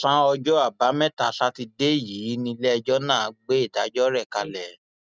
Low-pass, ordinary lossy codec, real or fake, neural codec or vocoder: none; none; real; none